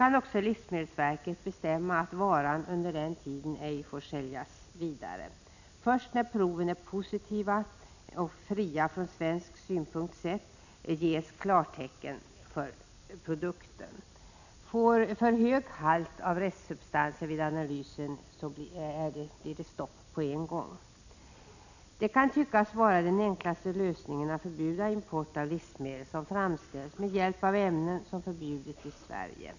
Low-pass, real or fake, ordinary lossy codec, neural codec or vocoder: 7.2 kHz; real; none; none